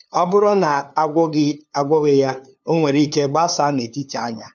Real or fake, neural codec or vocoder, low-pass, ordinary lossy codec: fake; codec, 16 kHz, 2 kbps, FunCodec, trained on LibriTTS, 25 frames a second; 7.2 kHz; none